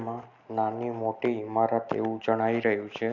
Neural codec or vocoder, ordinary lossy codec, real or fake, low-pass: none; none; real; 7.2 kHz